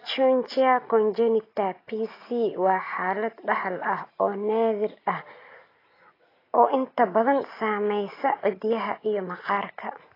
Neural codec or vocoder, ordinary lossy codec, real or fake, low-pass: none; AAC, 24 kbps; real; 5.4 kHz